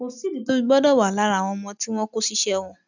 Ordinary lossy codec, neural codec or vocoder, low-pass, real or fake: none; none; 7.2 kHz; real